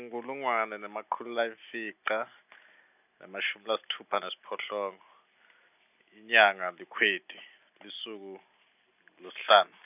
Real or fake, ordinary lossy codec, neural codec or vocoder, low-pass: real; none; none; 3.6 kHz